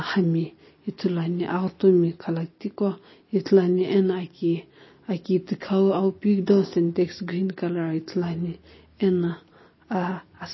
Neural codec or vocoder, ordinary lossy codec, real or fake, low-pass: none; MP3, 24 kbps; real; 7.2 kHz